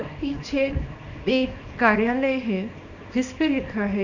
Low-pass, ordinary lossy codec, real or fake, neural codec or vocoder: 7.2 kHz; none; fake; codec, 24 kHz, 0.9 kbps, WavTokenizer, small release